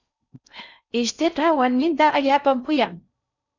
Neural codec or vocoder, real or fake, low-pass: codec, 16 kHz in and 24 kHz out, 0.6 kbps, FocalCodec, streaming, 4096 codes; fake; 7.2 kHz